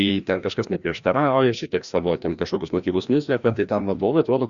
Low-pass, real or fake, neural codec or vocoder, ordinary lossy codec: 7.2 kHz; fake; codec, 16 kHz, 1 kbps, FreqCodec, larger model; Opus, 64 kbps